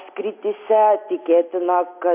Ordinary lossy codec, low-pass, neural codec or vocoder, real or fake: MP3, 24 kbps; 3.6 kHz; none; real